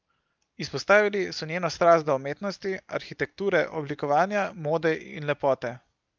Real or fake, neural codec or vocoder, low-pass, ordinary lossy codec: real; none; 7.2 kHz; Opus, 24 kbps